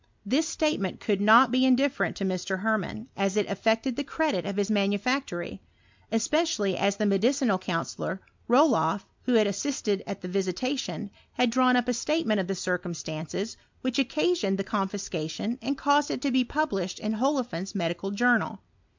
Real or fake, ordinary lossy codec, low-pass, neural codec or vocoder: real; MP3, 64 kbps; 7.2 kHz; none